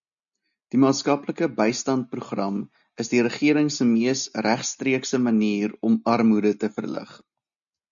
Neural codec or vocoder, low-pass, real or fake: none; 7.2 kHz; real